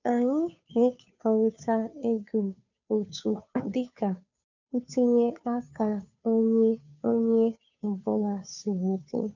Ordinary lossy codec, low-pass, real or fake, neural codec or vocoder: none; 7.2 kHz; fake; codec, 16 kHz, 2 kbps, FunCodec, trained on Chinese and English, 25 frames a second